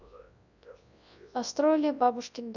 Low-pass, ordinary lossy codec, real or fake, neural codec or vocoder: 7.2 kHz; none; fake; codec, 24 kHz, 0.9 kbps, WavTokenizer, large speech release